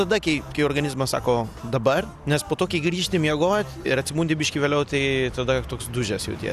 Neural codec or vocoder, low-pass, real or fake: none; 14.4 kHz; real